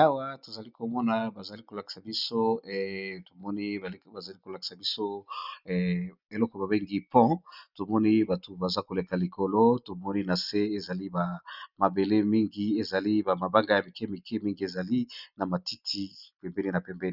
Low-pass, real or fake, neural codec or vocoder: 5.4 kHz; real; none